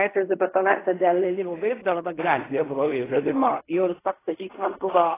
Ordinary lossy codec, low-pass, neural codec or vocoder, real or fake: AAC, 16 kbps; 3.6 kHz; codec, 16 kHz in and 24 kHz out, 0.4 kbps, LongCat-Audio-Codec, fine tuned four codebook decoder; fake